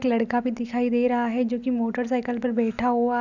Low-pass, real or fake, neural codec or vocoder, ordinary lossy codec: 7.2 kHz; real; none; none